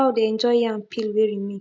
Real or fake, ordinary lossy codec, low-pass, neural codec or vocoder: real; none; none; none